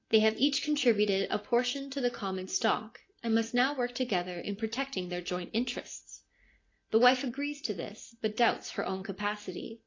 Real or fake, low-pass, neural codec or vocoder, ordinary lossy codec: real; 7.2 kHz; none; AAC, 32 kbps